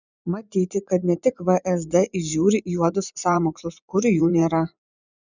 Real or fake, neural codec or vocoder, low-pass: fake; vocoder, 24 kHz, 100 mel bands, Vocos; 7.2 kHz